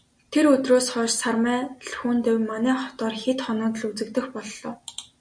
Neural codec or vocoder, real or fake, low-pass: none; real; 9.9 kHz